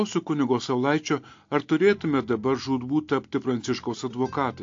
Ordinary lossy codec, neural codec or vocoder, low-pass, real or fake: AAC, 48 kbps; none; 7.2 kHz; real